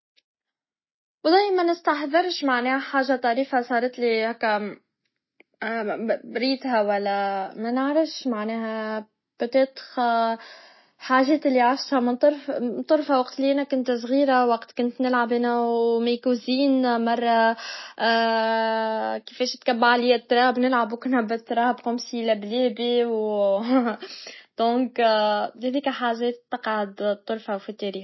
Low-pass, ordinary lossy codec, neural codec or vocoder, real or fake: 7.2 kHz; MP3, 24 kbps; none; real